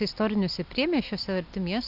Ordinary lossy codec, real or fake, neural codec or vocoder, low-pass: AAC, 48 kbps; real; none; 5.4 kHz